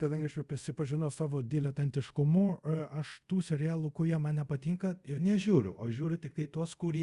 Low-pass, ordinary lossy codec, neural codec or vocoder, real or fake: 10.8 kHz; Opus, 64 kbps; codec, 24 kHz, 0.5 kbps, DualCodec; fake